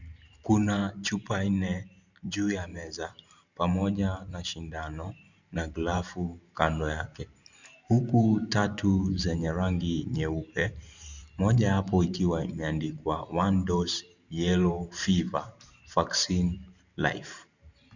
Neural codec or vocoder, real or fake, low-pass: vocoder, 44.1 kHz, 128 mel bands every 512 samples, BigVGAN v2; fake; 7.2 kHz